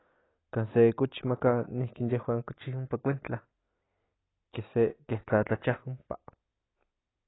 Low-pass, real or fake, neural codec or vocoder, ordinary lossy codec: 7.2 kHz; real; none; AAC, 16 kbps